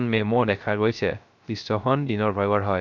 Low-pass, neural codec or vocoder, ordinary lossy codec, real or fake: 7.2 kHz; codec, 16 kHz, 0.3 kbps, FocalCodec; none; fake